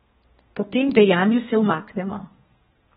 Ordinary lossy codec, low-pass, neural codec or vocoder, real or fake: AAC, 16 kbps; 14.4 kHz; codec, 32 kHz, 1.9 kbps, SNAC; fake